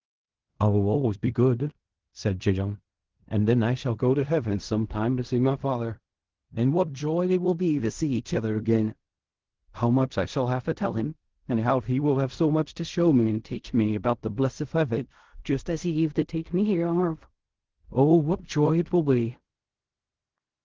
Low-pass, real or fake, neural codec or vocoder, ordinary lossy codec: 7.2 kHz; fake; codec, 16 kHz in and 24 kHz out, 0.4 kbps, LongCat-Audio-Codec, fine tuned four codebook decoder; Opus, 16 kbps